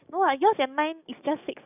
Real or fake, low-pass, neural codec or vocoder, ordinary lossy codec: fake; 3.6 kHz; codec, 44.1 kHz, 7.8 kbps, DAC; none